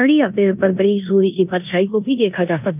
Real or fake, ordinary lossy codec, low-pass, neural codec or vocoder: fake; none; 3.6 kHz; codec, 16 kHz in and 24 kHz out, 0.9 kbps, LongCat-Audio-Codec, four codebook decoder